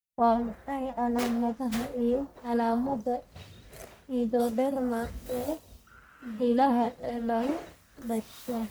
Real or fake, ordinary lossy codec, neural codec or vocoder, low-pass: fake; none; codec, 44.1 kHz, 1.7 kbps, Pupu-Codec; none